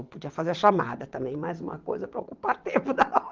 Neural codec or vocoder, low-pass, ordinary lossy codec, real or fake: vocoder, 22.05 kHz, 80 mel bands, WaveNeXt; 7.2 kHz; Opus, 32 kbps; fake